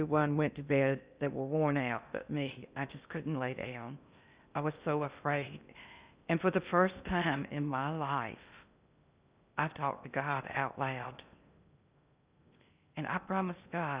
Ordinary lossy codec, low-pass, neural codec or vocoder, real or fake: Opus, 64 kbps; 3.6 kHz; codec, 16 kHz in and 24 kHz out, 0.6 kbps, FocalCodec, streaming, 2048 codes; fake